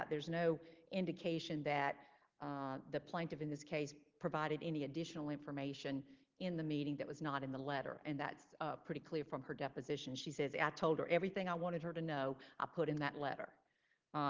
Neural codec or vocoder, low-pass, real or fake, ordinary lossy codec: none; 7.2 kHz; real; Opus, 16 kbps